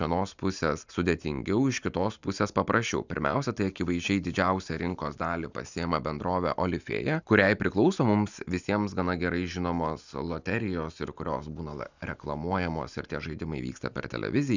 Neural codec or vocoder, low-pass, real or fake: none; 7.2 kHz; real